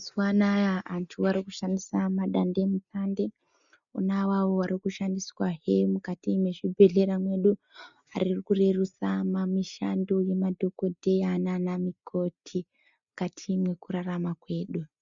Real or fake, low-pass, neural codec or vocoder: real; 7.2 kHz; none